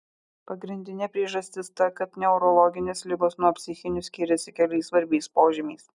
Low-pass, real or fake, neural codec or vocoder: 10.8 kHz; real; none